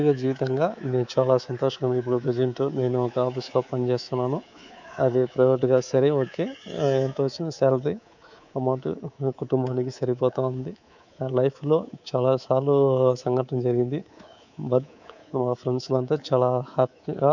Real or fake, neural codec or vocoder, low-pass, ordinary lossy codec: fake; codec, 24 kHz, 3.1 kbps, DualCodec; 7.2 kHz; none